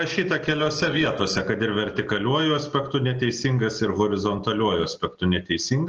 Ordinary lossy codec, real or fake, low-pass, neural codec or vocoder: Opus, 16 kbps; real; 7.2 kHz; none